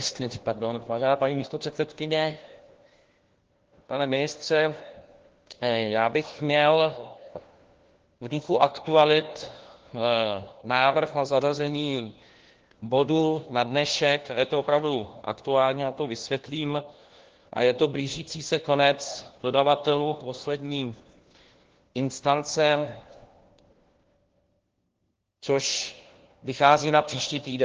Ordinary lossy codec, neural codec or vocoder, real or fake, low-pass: Opus, 16 kbps; codec, 16 kHz, 1 kbps, FunCodec, trained on LibriTTS, 50 frames a second; fake; 7.2 kHz